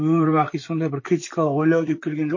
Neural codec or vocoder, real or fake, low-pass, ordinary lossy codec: vocoder, 44.1 kHz, 128 mel bands, Pupu-Vocoder; fake; 7.2 kHz; MP3, 32 kbps